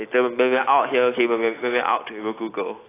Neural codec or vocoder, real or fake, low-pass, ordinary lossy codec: none; real; 3.6 kHz; AAC, 16 kbps